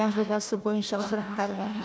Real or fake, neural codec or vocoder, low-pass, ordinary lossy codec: fake; codec, 16 kHz, 1 kbps, FunCodec, trained on Chinese and English, 50 frames a second; none; none